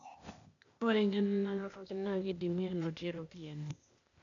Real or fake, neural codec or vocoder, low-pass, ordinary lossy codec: fake; codec, 16 kHz, 0.8 kbps, ZipCodec; 7.2 kHz; none